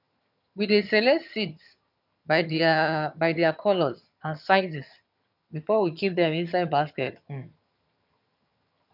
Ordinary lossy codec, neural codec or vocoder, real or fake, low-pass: none; vocoder, 22.05 kHz, 80 mel bands, HiFi-GAN; fake; 5.4 kHz